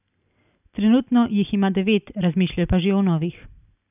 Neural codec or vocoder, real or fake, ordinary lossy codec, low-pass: none; real; none; 3.6 kHz